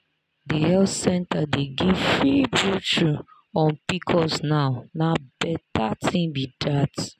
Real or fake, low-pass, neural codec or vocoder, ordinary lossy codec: real; 14.4 kHz; none; none